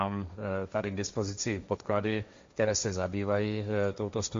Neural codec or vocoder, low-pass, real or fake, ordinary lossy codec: codec, 16 kHz, 1.1 kbps, Voila-Tokenizer; 7.2 kHz; fake; MP3, 48 kbps